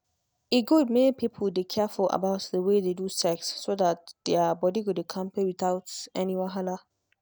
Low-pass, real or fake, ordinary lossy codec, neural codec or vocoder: none; real; none; none